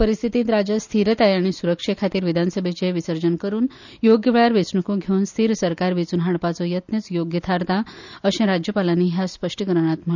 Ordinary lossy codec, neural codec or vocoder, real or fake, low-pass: none; none; real; 7.2 kHz